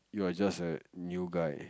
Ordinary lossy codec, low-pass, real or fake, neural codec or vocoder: none; none; real; none